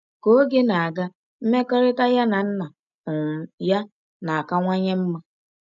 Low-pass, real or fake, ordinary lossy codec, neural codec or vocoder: 7.2 kHz; real; none; none